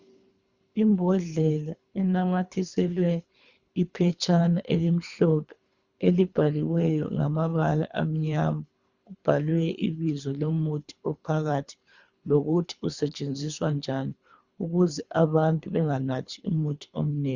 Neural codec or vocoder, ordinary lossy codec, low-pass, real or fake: codec, 24 kHz, 3 kbps, HILCodec; Opus, 64 kbps; 7.2 kHz; fake